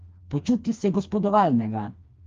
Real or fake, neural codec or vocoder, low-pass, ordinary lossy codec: fake; codec, 16 kHz, 2 kbps, FreqCodec, smaller model; 7.2 kHz; Opus, 32 kbps